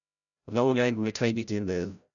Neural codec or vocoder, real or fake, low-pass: codec, 16 kHz, 0.5 kbps, FreqCodec, larger model; fake; 7.2 kHz